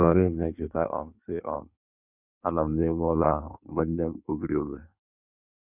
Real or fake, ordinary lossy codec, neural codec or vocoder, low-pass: fake; none; codec, 16 kHz in and 24 kHz out, 1.1 kbps, FireRedTTS-2 codec; 3.6 kHz